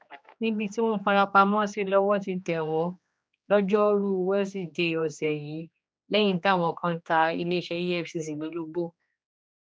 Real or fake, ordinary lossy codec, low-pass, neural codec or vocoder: fake; none; none; codec, 16 kHz, 2 kbps, X-Codec, HuBERT features, trained on general audio